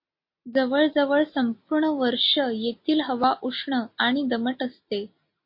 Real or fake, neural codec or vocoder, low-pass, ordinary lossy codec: real; none; 5.4 kHz; MP3, 32 kbps